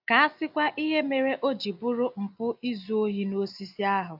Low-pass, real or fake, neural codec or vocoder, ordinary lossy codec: 5.4 kHz; real; none; AAC, 48 kbps